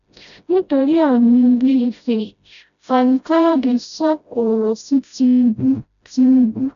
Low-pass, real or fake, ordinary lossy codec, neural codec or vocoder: 7.2 kHz; fake; none; codec, 16 kHz, 0.5 kbps, FreqCodec, smaller model